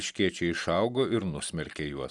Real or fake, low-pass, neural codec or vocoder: real; 10.8 kHz; none